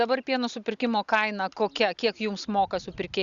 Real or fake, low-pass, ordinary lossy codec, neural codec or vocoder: real; 7.2 kHz; Opus, 64 kbps; none